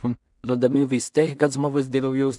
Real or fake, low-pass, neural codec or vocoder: fake; 10.8 kHz; codec, 16 kHz in and 24 kHz out, 0.4 kbps, LongCat-Audio-Codec, two codebook decoder